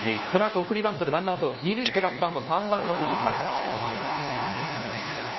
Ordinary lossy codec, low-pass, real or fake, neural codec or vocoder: MP3, 24 kbps; 7.2 kHz; fake; codec, 16 kHz, 1 kbps, FunCodec, trained on LibriTTS, 50 frames a second